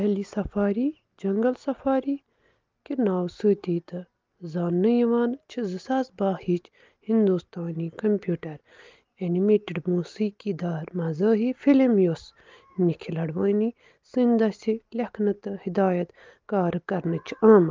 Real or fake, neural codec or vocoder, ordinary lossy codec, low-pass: real; none; Opus, 32 kbps; 7.2 kHz